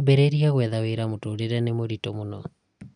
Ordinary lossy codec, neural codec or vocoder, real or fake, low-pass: none; none; real; 9.9 kHz